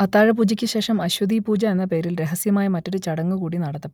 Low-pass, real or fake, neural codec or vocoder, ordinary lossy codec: 19.8 kHz; real; none; none